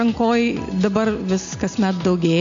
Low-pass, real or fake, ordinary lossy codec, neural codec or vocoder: 7.2 kHz; real; AAC, 48 kbps; none